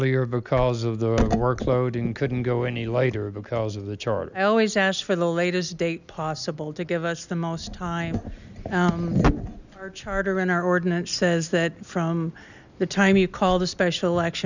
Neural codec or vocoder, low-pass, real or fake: none; 7.2 kHz; real